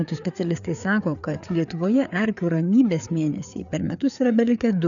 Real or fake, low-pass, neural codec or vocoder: fake; 7.2 kHz; codec, 16 kHz, 4 kbps, FreqCodec, larger model